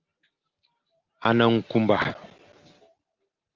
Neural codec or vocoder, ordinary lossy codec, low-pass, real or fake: none; Opus, 32 kbps; 7.2 kHz; real